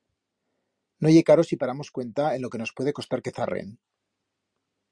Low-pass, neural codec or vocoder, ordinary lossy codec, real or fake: 9.9 kHz; none; Opus, 64 kbps; real